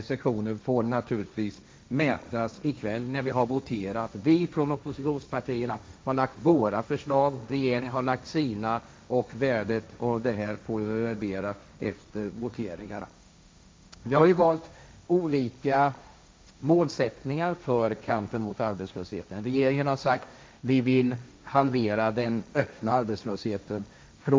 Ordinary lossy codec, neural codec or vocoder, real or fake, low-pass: none; codec, 16 kHz, 1.1 kbps, Voila-Tokenizer; fake; none